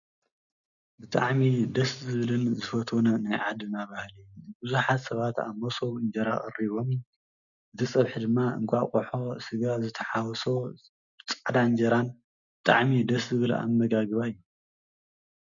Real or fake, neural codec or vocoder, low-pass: real; none; 7.2 kHz